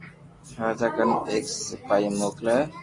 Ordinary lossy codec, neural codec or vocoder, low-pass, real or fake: AAC, 32 kbps; none; 10.8 kHz; real